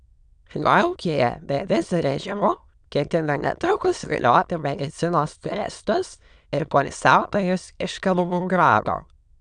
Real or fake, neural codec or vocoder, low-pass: fake; autoencoder, 22.05 kHz, a latent of 192 numbers a frame, VITS, trained on many speakers; 9.9 kHz